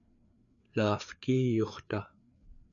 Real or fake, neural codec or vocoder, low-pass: fake; codec, 16 kHz, 4 kbps, FreqCodec, larger model; 7.2 kHz